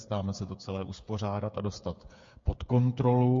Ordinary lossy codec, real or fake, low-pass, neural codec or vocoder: MP3, 48 kbps; fake; 7.2 kHz; codec, 16 kHz, 8 kbps, FreqCodec, smaller model